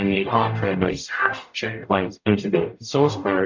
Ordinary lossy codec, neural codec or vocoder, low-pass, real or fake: MP3, 64 kbps; codec, 44.1 kHz, 0.9 kbps, DAC; 7.2 kHz; fake